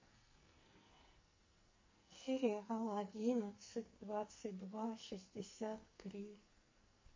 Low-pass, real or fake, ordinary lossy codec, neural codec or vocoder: 7.2 kHz; fake; MP3, 32 kbps; codec, 44.1 kHz, 2.6 kbps, SNAC